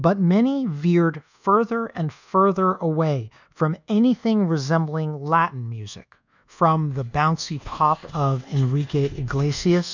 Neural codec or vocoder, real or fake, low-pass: codec, 24 kHz, 1.2 kbps, DualCodec; fake; 7.2 kHz